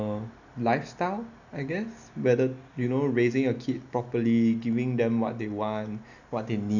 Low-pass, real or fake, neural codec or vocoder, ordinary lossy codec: 7.2 kHz; real; none; none